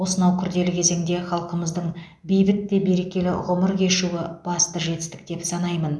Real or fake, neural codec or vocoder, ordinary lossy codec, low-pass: real; none; none; none